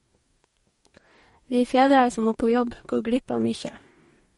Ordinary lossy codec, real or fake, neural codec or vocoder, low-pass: MP3, 48 kbps; fake; codec, 44.1 kHz, 2.6 kbps, DAC; 19.8 kHz